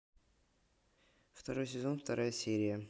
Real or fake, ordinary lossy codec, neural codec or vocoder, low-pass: real; none; none; none